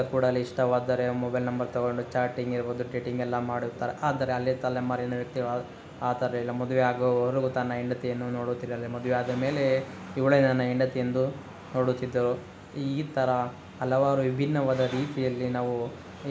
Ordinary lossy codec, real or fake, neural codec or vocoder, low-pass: none; real; none; none